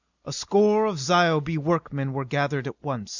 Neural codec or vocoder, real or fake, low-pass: none; real; 7.2 kHz